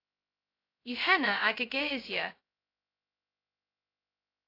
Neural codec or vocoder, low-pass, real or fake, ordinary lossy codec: codec, 16 kHz, 0.2 kbps, FocalCodec; 5.4 kHz; fake; AAC, 32 kbps